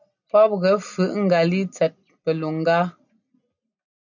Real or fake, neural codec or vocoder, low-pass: real; none; 7.2 kHz